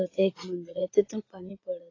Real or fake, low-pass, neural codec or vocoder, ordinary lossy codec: fake; 7.2 kHz; vocoder, 44.1 kHz, 80 mel bands, Vocos; AAC, 32 kbps